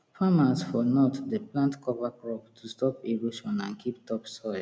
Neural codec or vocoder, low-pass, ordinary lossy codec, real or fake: none; none; none; real